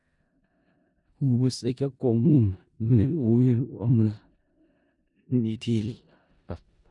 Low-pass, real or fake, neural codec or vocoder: 10.8 kHz; fake; codec, 16 kHz in and 24 kHz out, 0.4 kbps, LongCat-Audio-Codec, four codebook decoder